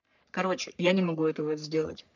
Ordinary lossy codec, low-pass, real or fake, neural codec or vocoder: none; 7.2 kHz; fake; codec, 44.1 kHz, 3.4 kbps, Pupu-Codec